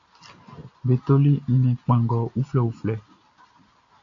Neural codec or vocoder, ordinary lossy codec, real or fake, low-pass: none; AAC, 64 kbps; real; 7.2 kHz